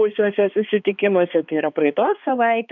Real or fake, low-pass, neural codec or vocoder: fake; 7.2 kHz; codec, 16 kHz, 2 kbps, X-Codec, HuBERT features, trained on balanced general audio